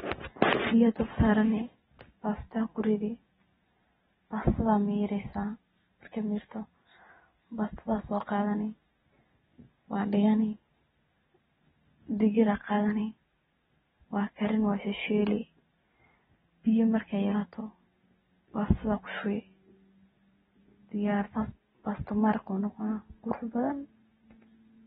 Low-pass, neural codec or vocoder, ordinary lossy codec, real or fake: 19.8 kHz; none; AAC, 16 kbps; real